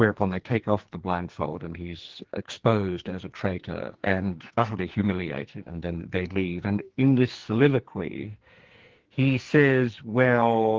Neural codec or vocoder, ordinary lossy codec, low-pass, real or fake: codec, 44.1 kHz, 2.6 kbps, SNAC; Opus, 16 kbps; 7.2 kHz; fake